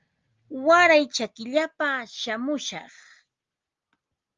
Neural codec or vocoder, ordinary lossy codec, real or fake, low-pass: none; Opus, 32 kbps; real; 7.2 kHz